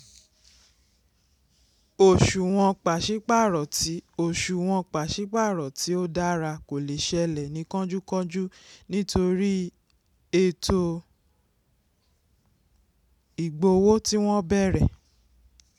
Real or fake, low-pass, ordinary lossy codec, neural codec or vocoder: real; 19.8 kHz; none; none